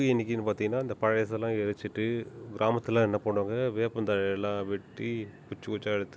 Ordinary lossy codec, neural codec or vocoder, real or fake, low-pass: none; none; real; none